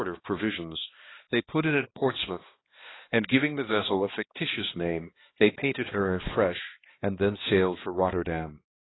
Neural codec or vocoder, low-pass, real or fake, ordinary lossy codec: codec, 16 kHz, 2 kbps, X-Codec, HuBERT features, trained on balanced general audio; 7.2 kHz; fake; AAC, 16 kbps